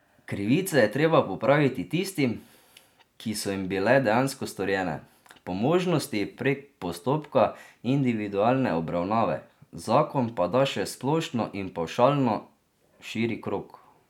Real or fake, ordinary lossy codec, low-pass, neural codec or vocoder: real; none; 19.8 kHz; none